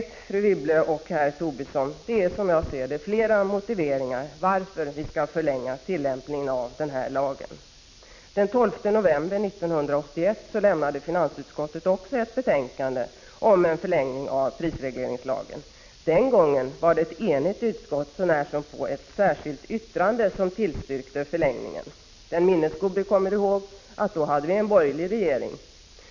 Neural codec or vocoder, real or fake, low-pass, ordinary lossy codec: none; real; 7.2 kHz; MP3, 64 kbps